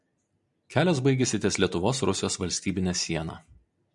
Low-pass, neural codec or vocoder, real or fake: 10.8 kHz; none; real